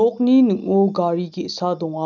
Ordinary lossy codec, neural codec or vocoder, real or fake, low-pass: none; none; real; 7.2 kHz